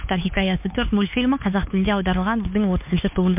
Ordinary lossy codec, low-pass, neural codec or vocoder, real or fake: MP3, 32 kbps; 3.6 kHz; codec, 16 kHz, 4 kbps, X-Codec, HuBERT features, trained on LibriSpeech; fake